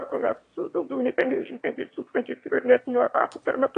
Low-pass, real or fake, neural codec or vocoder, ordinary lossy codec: 9.9 kHz; fake; autoencoder, 22.05 kHz, a latent of 192 numbers a frame, VITS, trained on one speaker; AAC, 48 kbps